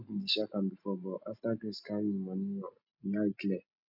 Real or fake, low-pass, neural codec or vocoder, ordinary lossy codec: real; 5.4 kHz; none; none